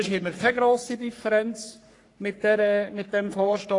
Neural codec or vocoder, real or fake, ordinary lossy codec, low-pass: codec, 44.1 kHz, 3.4 kbps, Pupu-Codec; fake; AAC, 48 kbps; 10.8 kHz